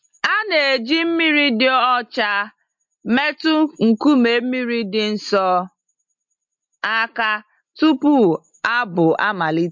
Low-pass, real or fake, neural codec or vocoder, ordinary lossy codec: 7.2 kHz; real; none; MP3, 48 kbps